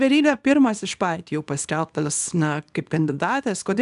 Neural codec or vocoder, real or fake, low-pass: codec, 24 kHz, 0.9 kbps, WavTokenizer, small release; fake; 10.8 kHz